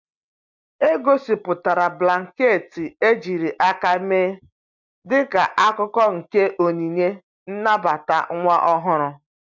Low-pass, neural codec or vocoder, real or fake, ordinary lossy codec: 7.2 kHz; none; real; MP3, 64 kbps